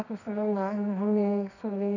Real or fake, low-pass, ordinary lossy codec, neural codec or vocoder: fake; 7.2 kHz; none; codec, 24 kHz, 0.9 kbps, WavTokenizer, medium music audio release